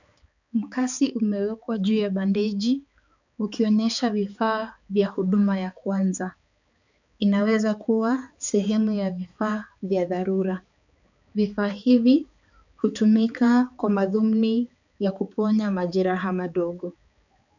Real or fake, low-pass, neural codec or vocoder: fake; 7.2 kHz; codec, 16 kHz, 4 kbps, X-Codec, HuBERT features, trained on balanced general audio